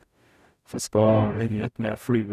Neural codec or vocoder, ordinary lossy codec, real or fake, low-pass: codec, 44.1 kHz, 0.9 kbps, DAC; none; fake; 14.4 kHz